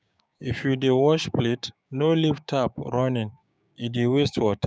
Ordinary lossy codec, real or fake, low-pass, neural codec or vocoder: none; fake; none; codec, 16 kHz, 6 kbps, DAC